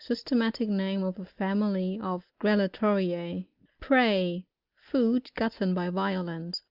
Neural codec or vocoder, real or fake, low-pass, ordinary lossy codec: none; real; 5.4 kHz; Opus, 24 kbps